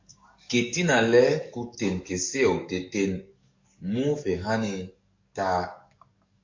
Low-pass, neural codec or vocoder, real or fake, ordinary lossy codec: 7.2 kHz; codec, 44.1 kHz, 7.8 kbps, DAC; fake; MP3, 48 kbps